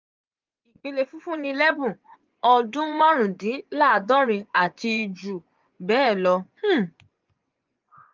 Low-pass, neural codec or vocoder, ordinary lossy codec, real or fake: 7.2 kHz; vocoder, 24 kHz, 100 mel bands, Vocos; Opus, 24 kbps; fake